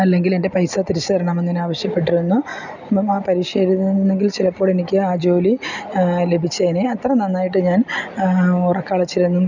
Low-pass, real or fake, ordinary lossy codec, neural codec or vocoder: 7.2 kHz; real; none; none